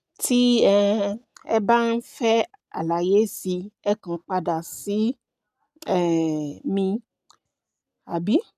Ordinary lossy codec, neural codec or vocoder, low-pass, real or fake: none; none; 14.4 kHz; real